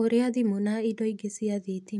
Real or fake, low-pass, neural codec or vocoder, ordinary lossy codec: real; none; none; none